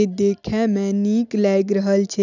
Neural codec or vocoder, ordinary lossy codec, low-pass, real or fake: none; none; 7.2 kHz; real